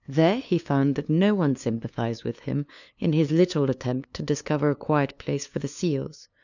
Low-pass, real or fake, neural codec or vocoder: 7.2 kHz; fake; codec, 16 kHz, 2 kbps, FunCodec, trained on LibriTTS, 25 frames a second